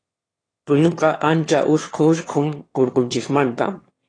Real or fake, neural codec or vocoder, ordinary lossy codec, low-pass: fake; autoencoder, 22.05 kHz, a latent of 192 numbers a frame, VITS, trained on one speaker; AAC, 32 kbps; 9.9 kHz